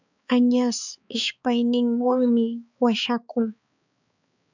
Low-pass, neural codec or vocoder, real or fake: 7.2 kHz; codec, 16 kHz, 4 kbps, X-Codec, HuBERT features, trained on balanced general audio; fake